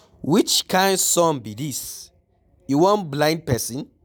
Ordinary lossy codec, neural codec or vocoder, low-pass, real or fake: none; none; none; real